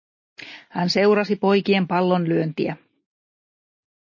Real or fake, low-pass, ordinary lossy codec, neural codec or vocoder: real; 7.2 kHz; MP3, 32 kbps; none